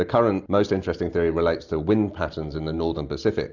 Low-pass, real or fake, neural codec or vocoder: 7.2 kHz; real; none